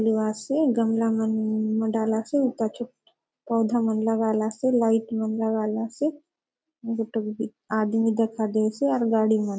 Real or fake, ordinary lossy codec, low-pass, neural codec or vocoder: real; none; none; none